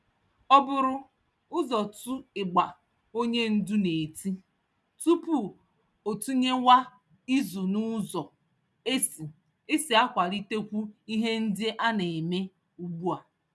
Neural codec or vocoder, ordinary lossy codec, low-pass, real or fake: none; none; none; real